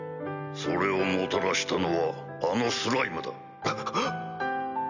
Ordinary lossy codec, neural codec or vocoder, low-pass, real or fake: none; none; 7.2 kHz; real